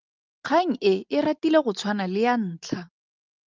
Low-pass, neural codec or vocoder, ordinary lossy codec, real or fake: 7.2 kHz; none; Opus, 24 kbps; real